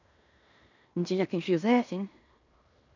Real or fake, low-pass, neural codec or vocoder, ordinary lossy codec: fake; 7.2 kHz; codec, 16 kHz in and 24 kHz out, 0.9 kbps, LongCat-Audio-Codec, fine tuned four codebook decoder; none